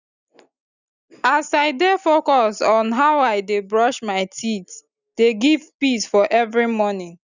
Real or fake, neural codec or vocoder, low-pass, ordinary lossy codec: real; none; 7.2 kHz; none